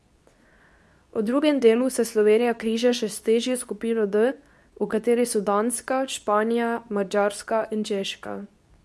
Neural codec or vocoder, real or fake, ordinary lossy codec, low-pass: codec, 24 kHz, 0.9 kbps, WavTokenizer, medium speech release version 2; fake; none; none